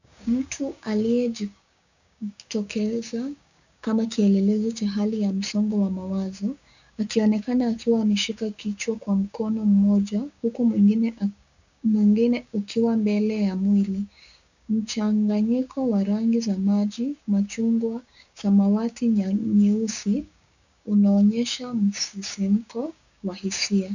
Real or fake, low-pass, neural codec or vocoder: fake; 7.2 kHz; codec, 16 kHz, 6 kbps, DAC